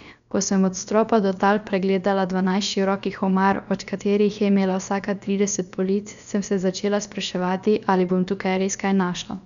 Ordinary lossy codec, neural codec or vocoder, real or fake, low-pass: none; codec, 16 kHz, about 1 kbps, DyCAST, with the encoder's durations; fake; 7.2 kHz